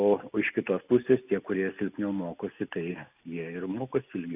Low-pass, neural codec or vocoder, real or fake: 3.6 kHz; none; real